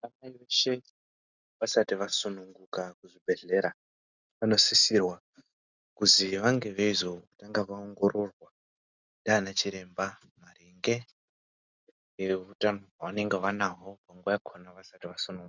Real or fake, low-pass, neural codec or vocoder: real; 7.2 kHz; none